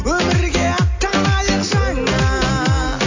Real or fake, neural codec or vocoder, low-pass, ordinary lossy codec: real; none; 7.2 kHz; AAC, 48 kbps